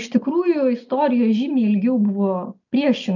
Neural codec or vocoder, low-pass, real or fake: none; 7.2 kHz; real